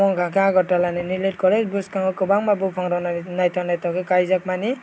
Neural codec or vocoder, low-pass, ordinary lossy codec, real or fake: none; none; none; real